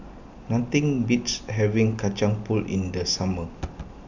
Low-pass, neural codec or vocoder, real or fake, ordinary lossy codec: 7.2 kHz; none; real; none